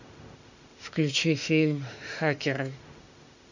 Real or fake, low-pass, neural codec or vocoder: fake; 7.2 kHz; autoencoder, 48 kHz, 32 numbers a frame, DAC-VAE, trained on Japanese speech